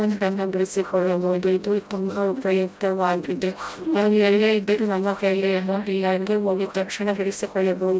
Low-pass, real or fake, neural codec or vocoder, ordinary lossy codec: none; fake; codec, 16 kHz, 0.5 kbps, FreqCodec, smaller model; none